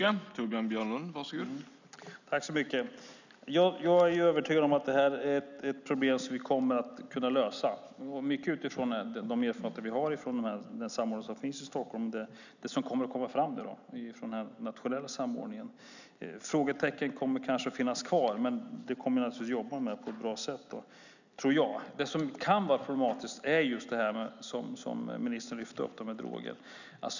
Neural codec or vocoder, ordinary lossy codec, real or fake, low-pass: none; none; real; 7.2 kHz